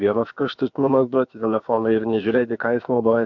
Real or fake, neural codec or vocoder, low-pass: fake; codec, 16 kHz, about 1 kbps, DyCAST, with the encoder's durations; 7.2 kHz